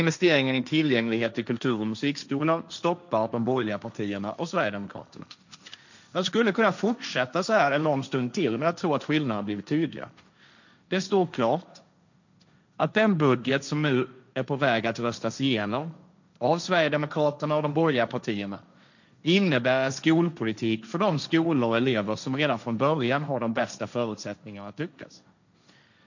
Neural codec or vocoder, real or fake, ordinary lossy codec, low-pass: codec, 16 kHz, 1.1 kbps, Voila-Tokenizer; fake; none; 7.2 kHz